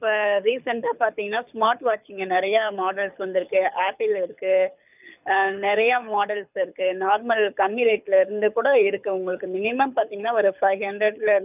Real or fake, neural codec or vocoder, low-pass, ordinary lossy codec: fake; codec, 24 kHz, 6 kbps, HILCodec; 3.6 kHz; none